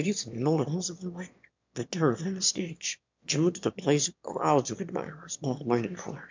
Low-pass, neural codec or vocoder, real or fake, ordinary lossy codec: 7.2 kHz; autoencoder, 22.05 kHz, a latent of 192 numbers a frame, VITS, trained on one speaker; fake; MP3, 64 kbps